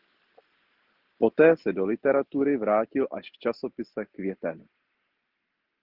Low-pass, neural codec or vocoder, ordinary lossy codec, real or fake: 5.4 kHz; none; Opus, 16 kbps; real